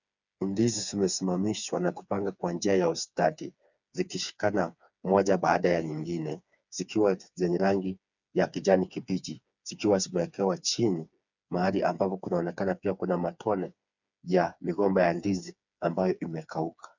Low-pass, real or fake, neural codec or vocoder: 7.2 kHz; fake; codec, 16 kHz, 4 kbps, FreqCodec, smaller model